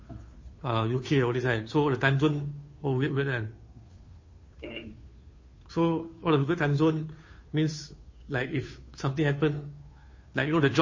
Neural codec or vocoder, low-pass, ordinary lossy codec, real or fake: codec, 16 kHz, 2 kbps, FunCodec, trained on Chinese and English, 25 frames a second; 7.2 kHz; MP3, 32 kbps; fake